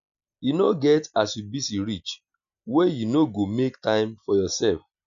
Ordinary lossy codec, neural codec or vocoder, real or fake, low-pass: none; none; real; 7.2 kHz